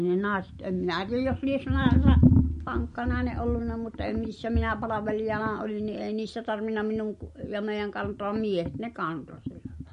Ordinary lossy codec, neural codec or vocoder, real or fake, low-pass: MP3, 48 kbps; none; real; 10.8 kHz